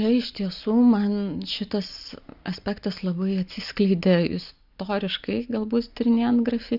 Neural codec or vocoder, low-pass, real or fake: none; 5.4 kHz; real